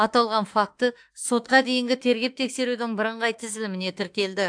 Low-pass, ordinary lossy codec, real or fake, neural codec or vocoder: 9.9 kHz; AAC, 64 kbps; fake; autoencoder, 48 kHz, 32 numbers a frame, DAC-VAE, trained on Japanese speech